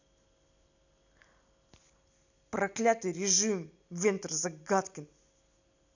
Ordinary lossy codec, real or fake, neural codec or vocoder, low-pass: none; real; none; 7.2 kHz